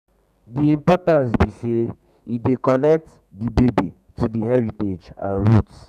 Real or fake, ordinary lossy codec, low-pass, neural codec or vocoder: fake; none; 14.4 kHz; codec, 32 kHz, 1.9 kbps, SNAC